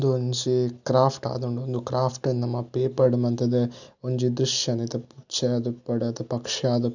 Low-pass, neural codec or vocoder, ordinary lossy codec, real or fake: 7.2 kHz; none; none; real